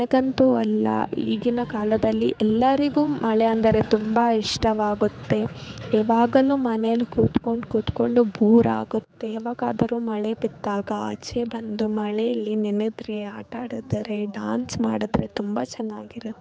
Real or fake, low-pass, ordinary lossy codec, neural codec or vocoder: fake; none; none; codec, 16 kHz, 4 kbps, X-Codec, HuBERT features, trained on general audio